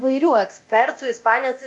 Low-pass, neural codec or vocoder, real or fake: 10.8 kHz; codec, 24 kHz, 0.9 kbps, DualCodec; fake